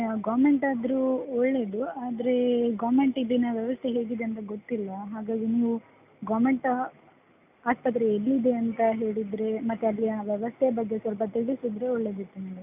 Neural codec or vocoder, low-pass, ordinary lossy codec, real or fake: none; 3.6 kHz; none; real